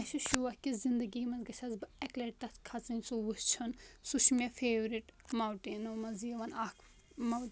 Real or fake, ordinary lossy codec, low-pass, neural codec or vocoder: real; none; none; none